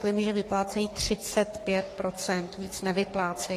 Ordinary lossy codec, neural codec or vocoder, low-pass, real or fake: AAC, 48 kbps; codec, 44.1 kHz, 3.4 kbps, Pupu-Codec; 14.4 kHz; fake